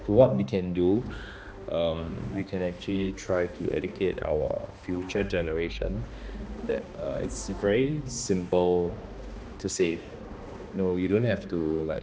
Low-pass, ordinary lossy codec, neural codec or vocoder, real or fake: none; none; codec, 16 kHz, 2 kbps, X-Codec, HuBERT features, trained on balanced general audio; fake